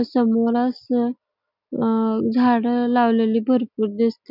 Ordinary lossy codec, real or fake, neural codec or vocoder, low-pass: none; real; none; 5.4 kHz